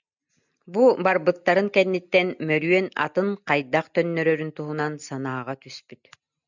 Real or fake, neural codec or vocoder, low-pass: real; none; 7.2 kHz